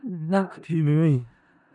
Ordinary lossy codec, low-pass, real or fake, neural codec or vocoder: MP3, 96 kbps; 10.8 kHz; fake; codec, 16 kHz in and 24 kHz out, 0.4 kbps, LongCat-Audio-Codec, four codebook decoder